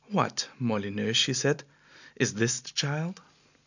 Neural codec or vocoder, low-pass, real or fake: none; 7.2 kHz; real